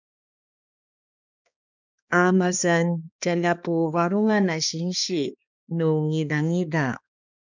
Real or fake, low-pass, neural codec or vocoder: fake; 7.2 kHz; codec, 16 kHz, 2 kbps, X-Codec, HuBERT features, trained on balanced general audio